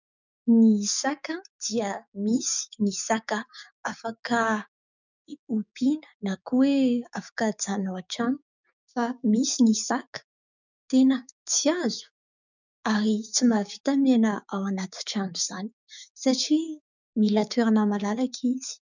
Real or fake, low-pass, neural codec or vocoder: fake; 7.2 kHz; codec, 16 kHz, 6 kbps, DAC